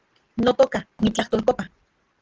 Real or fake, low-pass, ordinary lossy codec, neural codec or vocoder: real; 7.2 kHz; Opus, 16 kbps; none